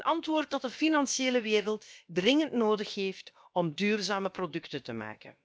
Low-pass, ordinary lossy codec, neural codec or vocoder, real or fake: none; none; codec, 16 kHz, about 1 kbps, DyCAST, with the encoder's durations; fake